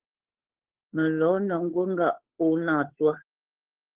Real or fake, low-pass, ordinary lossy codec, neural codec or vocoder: fake; 3.6 kHz; Opus, 16 kbps; codec, 16 kHz, 8 kbps, FunCodec, trained on Chinese and English, 25 frames a second